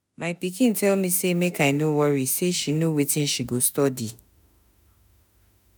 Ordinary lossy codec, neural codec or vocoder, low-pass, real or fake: none; autoencoder, 48 kHz, 32 numbers a frame, DAC-VAE, trained on Japanese speech; none; fake